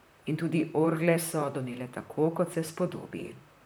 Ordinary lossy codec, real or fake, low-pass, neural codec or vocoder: none; fake; none; vocoder, 44.1 kHz, 128 mel bands, Pupu-Vocoder